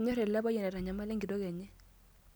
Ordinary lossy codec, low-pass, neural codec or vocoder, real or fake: none; none; none; real